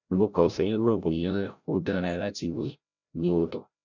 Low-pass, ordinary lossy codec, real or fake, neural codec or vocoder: 7.2 kHz; none; fake; codec, 16 kHz, 0.5 kbps, FreqCodec, larger model